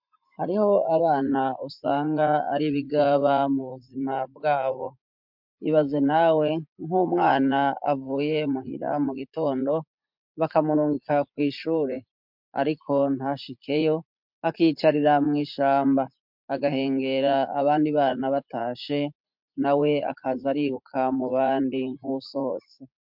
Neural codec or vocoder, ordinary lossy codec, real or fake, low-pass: vocoder, 44.1 kHz, 80 mel bands, Vocos; MP3, 48 kbps; fake; 5.4 kHz